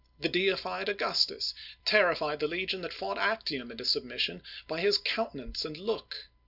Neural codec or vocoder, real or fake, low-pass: none; real; 5.4 kHz